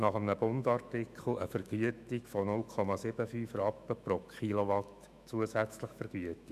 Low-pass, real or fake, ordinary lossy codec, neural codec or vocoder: 14.4 kHz; fake; none; autoencoder, 48 kHz, 128 numbers a frame, DAC-VAE, trained on Japanese speech